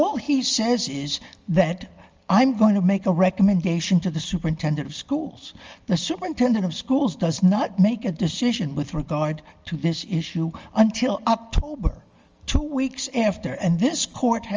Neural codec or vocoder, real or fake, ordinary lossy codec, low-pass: none; real; Opus, 32 kbps; 7.2 kHz